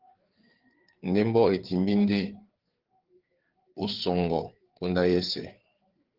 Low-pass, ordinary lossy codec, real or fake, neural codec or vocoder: 5.4 kHz; Opus, 16 kbps; fake; codec, 16 kHz, 4 kbps, FreqCodec, larger model